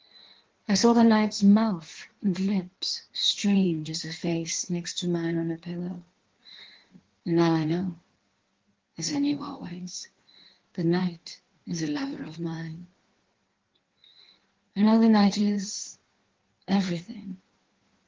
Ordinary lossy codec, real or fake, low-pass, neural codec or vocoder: Opus, 16 kbps; fake; 7.2 kHz; codec, 16 kHz in and 24 kHz out, 1.1 kbps, FireRedTTS-2 codec